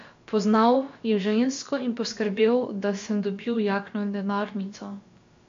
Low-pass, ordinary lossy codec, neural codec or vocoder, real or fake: 7.2 kHz; MP3, 48 kbps; codec, 16 kHz, about 1 kbps, DyCAST, with the encoder's durations; fake